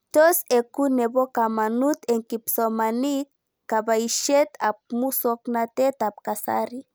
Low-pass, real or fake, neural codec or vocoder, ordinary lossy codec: none; real; none; none